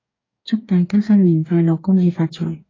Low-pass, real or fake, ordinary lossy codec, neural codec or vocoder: 7.2 kHz; fake; AAC, 32 kbps; codec, 44.1 kHz, 2.6 kbps, DAC